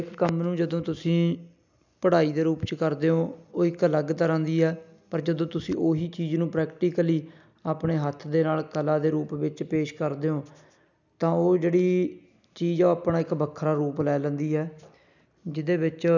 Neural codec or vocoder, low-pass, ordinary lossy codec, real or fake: none; 7.2 kHz; none; real